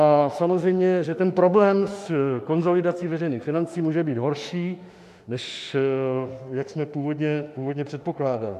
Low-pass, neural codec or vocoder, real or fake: 14.4 kHz; autoencoder, 48 kHz, 32 numbers a frame, DAC-VAE, trained on Japanese speech; fake